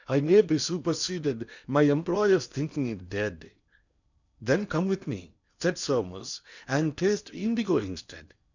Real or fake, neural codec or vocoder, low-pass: fake; codec, 16 kHz in and 24 kHz out, 0.8 kbps, FocalCodec, streaming, 65536 codes; 7.2 kHz